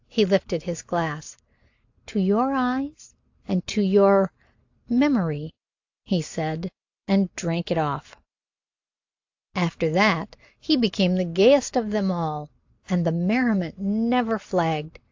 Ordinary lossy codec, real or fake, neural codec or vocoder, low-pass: AAC, 48 kbps; real; none; 7.2 kHz